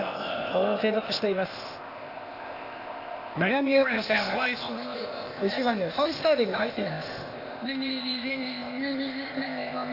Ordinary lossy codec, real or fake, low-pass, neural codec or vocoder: AAC, 32 kbps; fake; 5.4 kHz; codec, 16 kHz, 0.8 kbps, ZipCodec